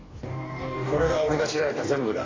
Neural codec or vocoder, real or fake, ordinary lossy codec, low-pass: codec, 44.1 kHz, 2.6 kbps, DAC; fake; AAC, 32 kbps; 7.2 kHz